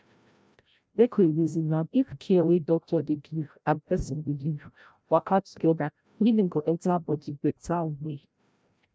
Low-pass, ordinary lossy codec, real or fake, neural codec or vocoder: none; none; fake; codec, 16 kHz, 0.5 kbps, FreqCodec, larger model